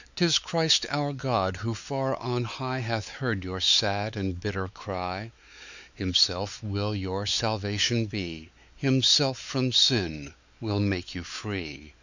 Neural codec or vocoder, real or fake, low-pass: codec, 16 kHz, 4 kbps, X-Codec, WavLM features, trained on Multilingual LibriSpeech; fake; 7.2 kHz